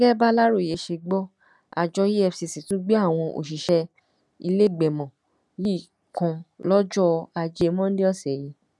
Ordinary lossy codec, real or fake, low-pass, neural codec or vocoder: none; real; none; none